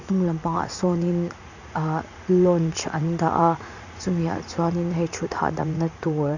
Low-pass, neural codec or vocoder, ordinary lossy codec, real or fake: 7.2 kHz; vocoder, 44.1 kHz, 80 mel bands, Vocos; none; fake